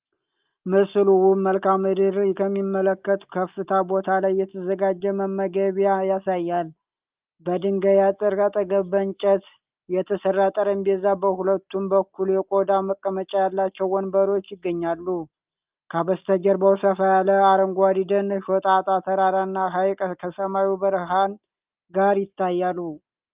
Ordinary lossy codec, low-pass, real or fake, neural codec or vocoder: Opus, 32 kbps; 3.6 kHz; real; none